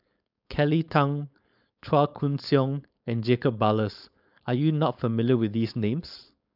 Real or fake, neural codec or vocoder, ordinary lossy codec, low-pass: fake; codec, 16 kHz, 4.8 kbps, FACodec; none; 5.4 kHz